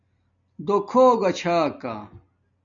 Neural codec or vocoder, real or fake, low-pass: none; real; 7.2 kHz